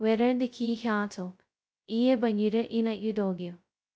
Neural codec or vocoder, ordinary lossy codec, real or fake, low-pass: codec, 16 kHz, 0.2 kbps, FocalCodec; none; fake; none